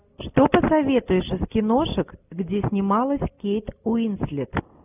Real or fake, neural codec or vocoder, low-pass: real; none; 3.6 kHz